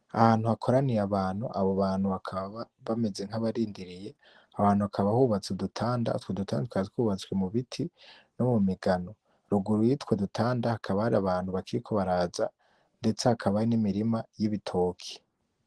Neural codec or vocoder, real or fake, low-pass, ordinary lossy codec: none; real; 10.8 kHz; Opus, 16 kbps